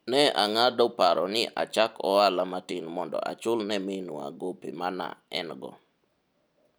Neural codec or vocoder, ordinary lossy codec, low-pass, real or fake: none; none; none; real